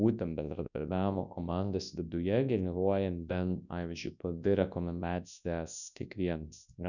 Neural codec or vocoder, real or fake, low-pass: codec, 24 kHz, 0.9 kbps, WavTokenizer, large speech release; fake; 7.2 kHz